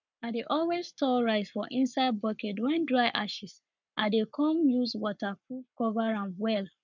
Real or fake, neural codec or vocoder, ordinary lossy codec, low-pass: fake; codec, 44.1 kHz, 7.8 kbps, Pupu-Codec; none; 7.2 kHz